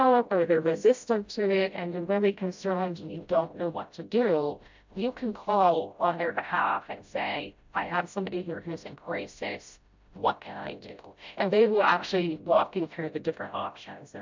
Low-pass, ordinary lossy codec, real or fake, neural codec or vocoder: 7.2 kHz; MP3, 64 kbps; fake; codec, 16 kHz, 0.5 kbps, FreqCodec, smaller model